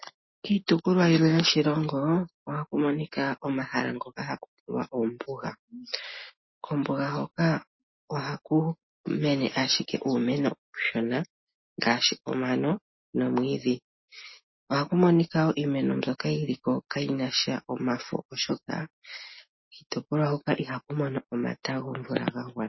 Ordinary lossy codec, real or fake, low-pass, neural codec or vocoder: MP3, 24 kbps; real; 7.2 kHz; none